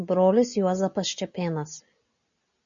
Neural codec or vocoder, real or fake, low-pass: none; real; 7.2 kHz